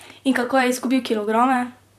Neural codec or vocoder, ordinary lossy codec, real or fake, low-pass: vocoder, 44.1 kHz, 128 mel bands, Pupu-Vocoder; none; fake; 14.4 kHz